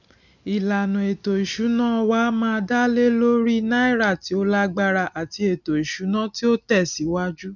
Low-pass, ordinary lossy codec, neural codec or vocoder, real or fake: 7.2 kHz; none; none; real